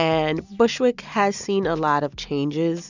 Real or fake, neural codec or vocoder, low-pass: real; none; 7.2 kHz